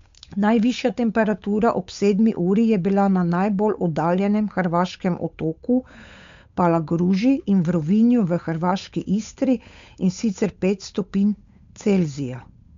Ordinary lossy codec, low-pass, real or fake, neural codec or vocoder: MP3, 64 kbps; 7.2 kHz; fake; codec, 16 kHz, 8 kbps, FunCodec, trained on Chinese and English, 25 frames a second